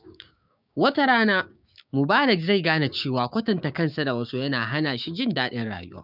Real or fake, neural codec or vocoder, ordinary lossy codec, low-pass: fake; codec, 16 kHz, 6 kbps, DAC; none; 5.4 kHz